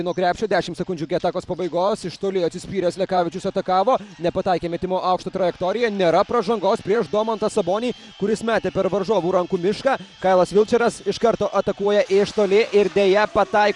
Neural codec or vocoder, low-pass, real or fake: vocoder, 44.1 kHz, 128 mel bands every 512 samples, BigVGAN v2; 10.8 kHz; fake